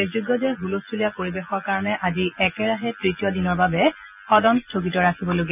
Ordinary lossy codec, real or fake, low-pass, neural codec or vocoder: none; real; 3.6 kHz; none